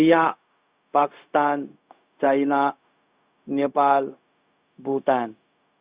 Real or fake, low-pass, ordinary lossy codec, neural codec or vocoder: fake; 3.6 kHz; Opus, 64 kbps; codec, 16 kHz, 0.4 kbps, LongCat-Audio-Codec